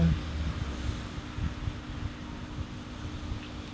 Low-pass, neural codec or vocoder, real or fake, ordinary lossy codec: none; none; real; none